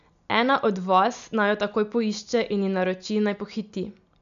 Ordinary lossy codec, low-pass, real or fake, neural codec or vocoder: none; 7.2 kHz; real; none